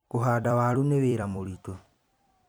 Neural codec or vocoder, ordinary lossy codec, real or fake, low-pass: vocoder, 44.1 kHz, 128 mel bands every 256 samples, BigVGAN v2; none; fake; none